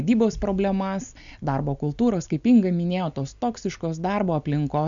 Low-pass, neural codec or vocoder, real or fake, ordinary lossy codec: 7.2 kHz; none; real; MP3, 96 kbps